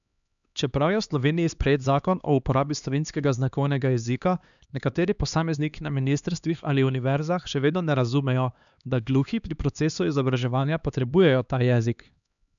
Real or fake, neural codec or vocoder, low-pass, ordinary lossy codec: fake; codec, 16 kHz, 2 kbps, X-Codec, HuBERT features, trained on LibriSpeech; 7.2 kHz; none